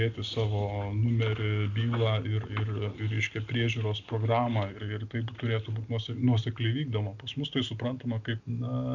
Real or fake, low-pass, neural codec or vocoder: real; 7.2 kHz; none